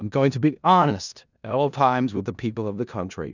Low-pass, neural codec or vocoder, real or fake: 7.2 kHz; codec, 16 kHz in and 24 kHz out, 0.4 kbps, LongCat-Audio-Codec, four codebook decoder; fake